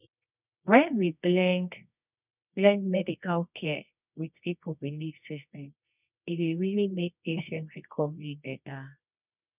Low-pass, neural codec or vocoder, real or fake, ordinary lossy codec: 3.6 kHz; codec, 24 kHz, 0.9 kbps, WavTokenizer, medium music audio release; fake; none